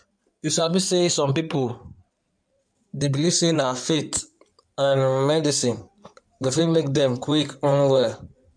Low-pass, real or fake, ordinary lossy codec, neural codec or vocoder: 9.9 kHz; fake; none; codec, 16 kHz in and 24 kHz out, 2.2 kbps, FireRedTTS-2 codec